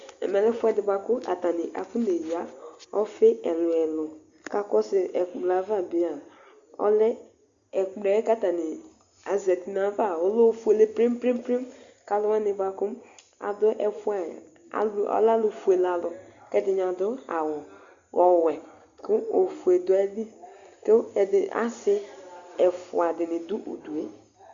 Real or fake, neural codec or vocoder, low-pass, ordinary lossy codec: real; none; 7.2 kHz; Opus, 64 kbps